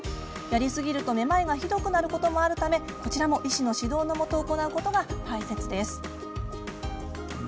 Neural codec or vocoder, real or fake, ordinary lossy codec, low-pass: none; real; none; none